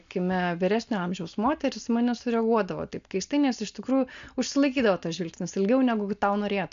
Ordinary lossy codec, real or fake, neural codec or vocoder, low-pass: MP3, 64 kbps; real; none; 7.2 kHz